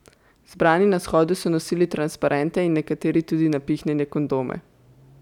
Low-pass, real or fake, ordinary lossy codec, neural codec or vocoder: 19.8 kHz; real; none; none